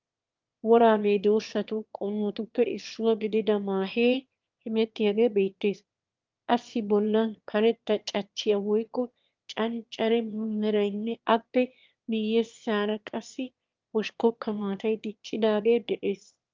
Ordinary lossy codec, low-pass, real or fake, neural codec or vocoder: Opus, 32 kbps; 7.2 kHz; fake; autoencoder, 22.05 kHz, a latent of 192 numbers a frame, VITS, trained on one speaker